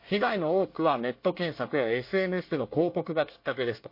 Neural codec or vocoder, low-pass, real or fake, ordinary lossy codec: codec, 24 kHz, 1 kbps, SNAC; 5.4 kHz; fake; MP3, 32 kbps